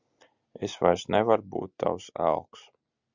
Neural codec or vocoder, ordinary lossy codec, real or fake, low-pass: none; Opus, 64 kbps; real; 7.2 kHz